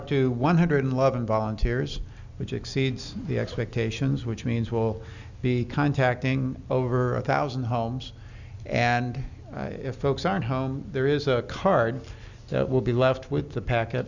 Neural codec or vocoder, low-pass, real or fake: none; 7.2 kHz; real